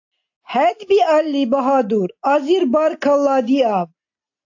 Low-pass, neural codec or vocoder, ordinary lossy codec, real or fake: 7.2 kHz; none; AAC, 48 kbps; real